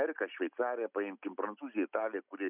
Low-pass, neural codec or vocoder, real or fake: 3.6 kHz; none; real